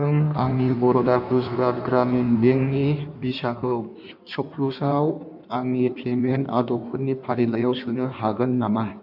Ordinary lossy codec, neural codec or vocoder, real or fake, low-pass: none; codec, 16 kHz in and 24 kHz out, 1.1 kbps, FireRedTTS-2 codec; fake; 5.4 kHz